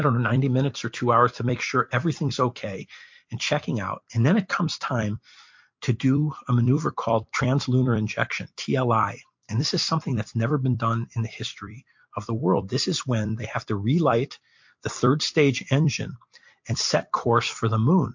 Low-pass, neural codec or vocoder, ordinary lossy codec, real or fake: 7.2 kHz; vocoder, 44.1 kHz, 128 mel bands every 256 samples, BigVGAN v2; MP3, 48 kbps; fake